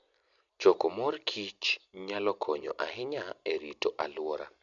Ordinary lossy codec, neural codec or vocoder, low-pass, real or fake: none; none; 7.2 kHz; real